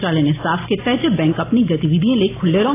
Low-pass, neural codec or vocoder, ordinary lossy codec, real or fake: 3.6 kHz; none; AAC, 16 kbps; real